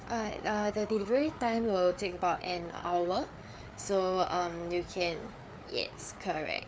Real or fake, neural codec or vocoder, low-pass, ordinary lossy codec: fake; codec, 16 kHz, 4 kbps, FreqCodec, larger model; none; none